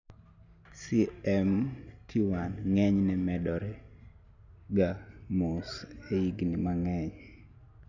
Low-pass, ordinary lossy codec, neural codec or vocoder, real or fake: 7.2 kHz; none; none; real